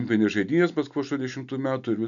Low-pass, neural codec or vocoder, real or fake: 7.2 kHz; none; real